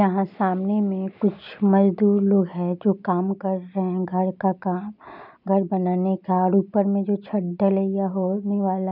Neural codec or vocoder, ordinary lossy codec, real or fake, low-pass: none; none; real; 5.4 kHz